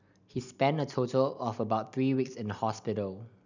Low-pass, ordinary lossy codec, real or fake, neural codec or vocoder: 7.2 kHz; none; real; none